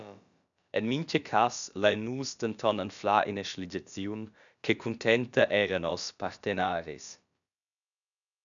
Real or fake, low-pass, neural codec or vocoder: fake; 7.2 kHz; codec, 16 kHz, about 1 kbps, DyCAST, with the encoder's durations